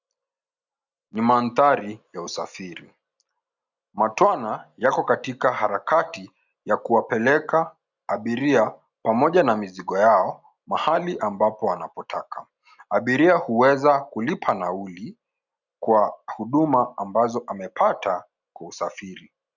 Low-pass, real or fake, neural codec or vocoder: 7.2 kHz; real; none